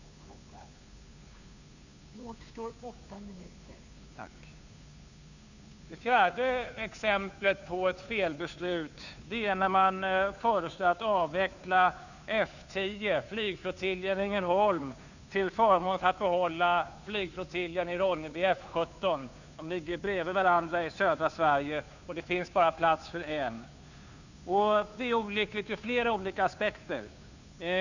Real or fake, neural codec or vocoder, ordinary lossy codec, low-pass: fake; codec, 16 kHz, 2 kbps, FunCodec, trained on Chinese and English, 25 frames a second; none; 7.2 kHz